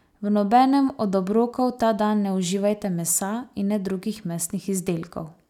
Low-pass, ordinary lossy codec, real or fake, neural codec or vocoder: 19.8 kHz; none; real; none